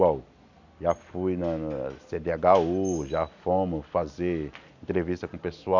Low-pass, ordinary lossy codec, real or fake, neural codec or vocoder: 7.2 kHz; none; real; none